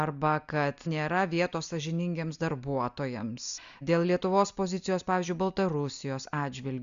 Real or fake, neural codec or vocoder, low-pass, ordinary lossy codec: real; none; 7.2 kHz; Opus, 64 kbps